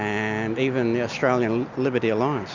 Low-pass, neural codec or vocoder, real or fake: 7.2 kHz; none; real